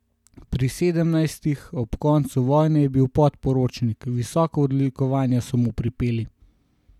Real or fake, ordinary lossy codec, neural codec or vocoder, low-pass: real; none; none; 19.8 kHz